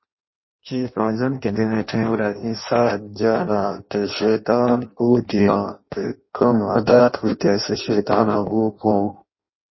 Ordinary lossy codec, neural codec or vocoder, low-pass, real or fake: MP3, 24 kbps; codec, 16 kHz in and 24 kHz out, 0.6 kbps, FireRedTTS-2 codec; 7.2 kHz; fake